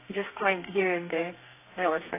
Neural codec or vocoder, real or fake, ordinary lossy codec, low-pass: codec, 32 kHz, 1.9 kbps, SNAC; fake; AAC, 24 kbps; 3.6 kHz